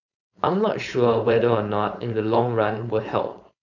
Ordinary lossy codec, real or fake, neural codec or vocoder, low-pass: none; fake; codec, 16 kHz, 4.8 kbps, FACodec; 7.2 kHz